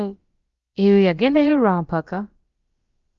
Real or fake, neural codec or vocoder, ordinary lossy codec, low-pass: fake; codec, 16 kHz, about 1 kbps, DyCAST, with the encoder's durations; Opus, 32 kbps; 7.2 kHz